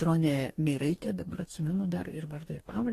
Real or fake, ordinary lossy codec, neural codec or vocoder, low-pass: fake; AAC, 48 kbps; codec, 44.1 kHz, 2.6 kbps, DAC; 14.4 kHz